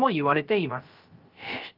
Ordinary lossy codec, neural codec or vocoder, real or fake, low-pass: Opus, 24 kbps; codec, 16 kHz, 0.3 kbps, FocalCodec; fake; 5.4 kHz